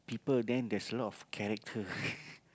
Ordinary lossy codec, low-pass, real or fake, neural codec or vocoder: none; none; real; none